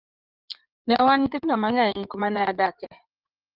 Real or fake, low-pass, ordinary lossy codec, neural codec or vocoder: fake; 5.4 kHz; Opus, 24 kbps; codec, 16 kHz in and 24 kHz out, 2.2 kbps, FireRedTTS-2 codec